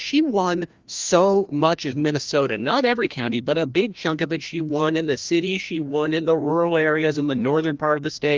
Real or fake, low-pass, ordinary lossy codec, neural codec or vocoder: fake; 7.2 kHz; Opus, 32 kbps; codec, 16 kHz, 1 kbps, FreqCodec, larger model